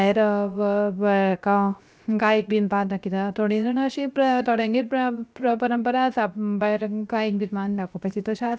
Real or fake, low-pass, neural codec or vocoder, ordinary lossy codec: fake; none; codec, 16 kHz, 0.7 kbps, FocalCodec; none